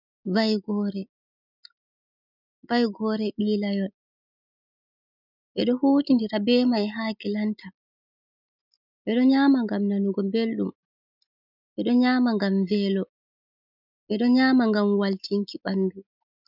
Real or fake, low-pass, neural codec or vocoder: real; 5.4 kHz; none